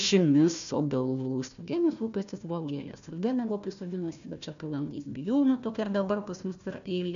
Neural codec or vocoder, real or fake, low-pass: codec, 16 kHz, 1 kbps, FunCodec, trained on Chinese and English, 50 frames a second; fake; 7.2 kHz